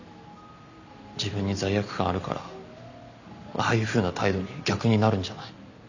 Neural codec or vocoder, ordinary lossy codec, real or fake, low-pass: none; none; real; 7.2 kHz